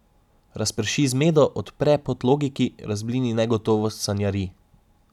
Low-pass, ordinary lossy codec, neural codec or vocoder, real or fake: 19.8 kHz; none; none; real